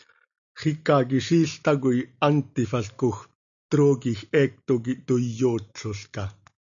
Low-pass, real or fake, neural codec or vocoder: 7.2 kHz; real; none